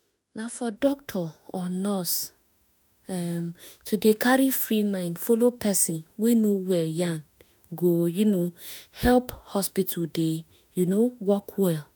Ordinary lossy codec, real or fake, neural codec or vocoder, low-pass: none; fake; autoencoder, 48 kHz, 32 numbers a frame, DAC-VAE, trained on Japanese speech; none